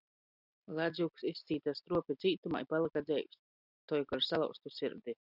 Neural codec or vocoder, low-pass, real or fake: none; 5.4 kHz; real